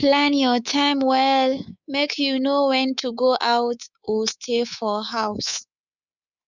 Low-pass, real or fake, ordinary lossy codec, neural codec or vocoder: 7.2 kHz; fake; none; codec, 24 kHz, 3.1 kbps, DualCodec